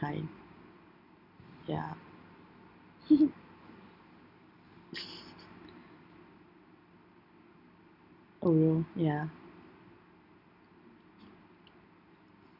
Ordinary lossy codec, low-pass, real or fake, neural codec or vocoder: none; 5.4 kHz; real; none